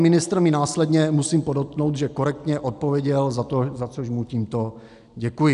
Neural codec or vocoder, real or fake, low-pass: none; real; 10.8 kHz